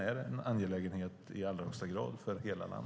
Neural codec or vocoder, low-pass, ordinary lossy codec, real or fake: none; none; none; real